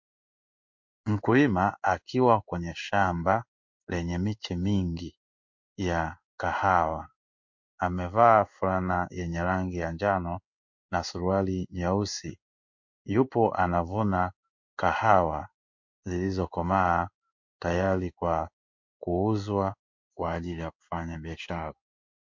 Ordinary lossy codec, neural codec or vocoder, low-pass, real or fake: MP3, 48 kbps; codec, 16 kHz in and 24 kHz out, 1 kbps, XY-Tokenizer; 7.2 kHz; fake